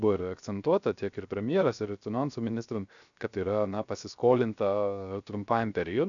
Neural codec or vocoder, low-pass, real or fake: codec, 16 kHz, 0.3 kbps, FocalCodec; 7.2 kHz; fake